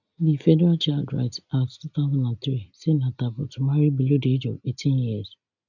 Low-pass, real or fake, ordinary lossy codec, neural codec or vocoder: 7.2 kHz; real; none; none